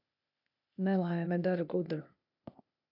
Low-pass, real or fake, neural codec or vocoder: 5.4 kHz; fake; codec, 16 kHz, 0.8 kbps, ZipCodec